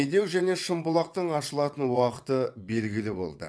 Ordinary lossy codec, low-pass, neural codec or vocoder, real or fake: none; none; vocoder, 22.05 kHz, 80 mel bands, WaveNeXt; fake